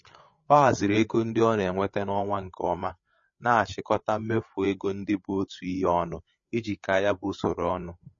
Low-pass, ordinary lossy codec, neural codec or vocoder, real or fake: 7.2 kHz; MP3, 32 kbps; codec, 16 kHz, 8 kbps, FreqCodec, larger model; fake